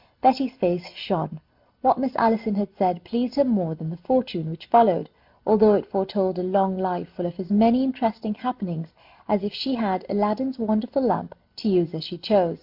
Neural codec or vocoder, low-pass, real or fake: none; 5.4 kHz; real